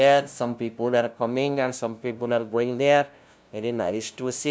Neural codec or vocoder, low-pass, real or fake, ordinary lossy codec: codec, 16 kHz, 0.5 kbps, FunCodec, trained on LibriTTS, 25 frames a second; none; fake; none